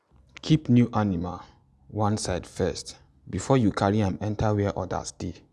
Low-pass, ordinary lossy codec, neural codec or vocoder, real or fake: none; none; none; real